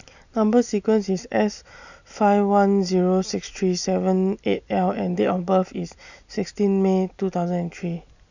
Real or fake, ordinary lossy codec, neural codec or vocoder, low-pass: real; none; none; 7.2 kHz